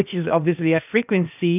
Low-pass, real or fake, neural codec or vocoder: 3.6 kHz; fake; codec, 16 kHz, 0.8 kbps, ZipCodec